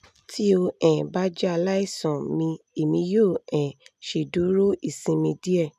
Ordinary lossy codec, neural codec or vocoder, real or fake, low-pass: none; none; real; 14.4 kHz